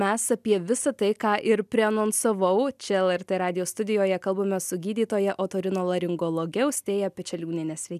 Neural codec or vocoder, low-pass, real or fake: none; 14.4 kHz; real